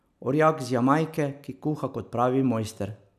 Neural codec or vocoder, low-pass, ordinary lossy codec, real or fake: none; 14.4 kHz; none; real